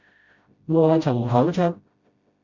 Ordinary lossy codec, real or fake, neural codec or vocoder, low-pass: Opus, 64 kbps; fake; codec, 16 kHz, 0.5 kbps, FreqCodec, smaller model; 7.2 kHz